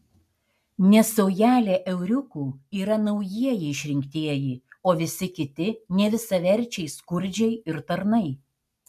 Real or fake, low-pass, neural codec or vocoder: real; 14.4 kHz; none